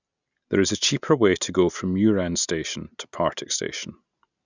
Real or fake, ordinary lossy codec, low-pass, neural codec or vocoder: real; none; 7.2 kHz; none